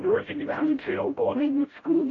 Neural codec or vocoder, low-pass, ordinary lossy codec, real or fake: codec, 16 kHz, 0.5 kbps, FreqCodec, smaller model; 7.2 kHz; AAC, 32 kbps; fake